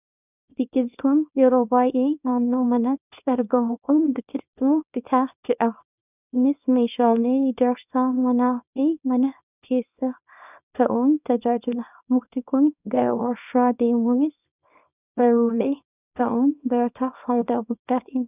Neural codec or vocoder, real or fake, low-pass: codec, 24 kHz, 0.9 kbps, WavTokenizer, small release; fake; 3.6 kHz